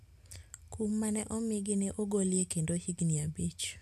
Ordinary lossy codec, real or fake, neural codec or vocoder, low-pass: none; real; none; 14.4 kHz